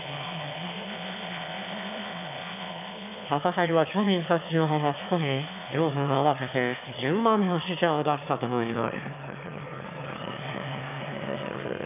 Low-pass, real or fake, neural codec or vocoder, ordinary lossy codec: 3.6 kHz; fake; autoencoder, 22.05 kHz, a latent of 192 numbers a frame, VITS, trained on one speaker; none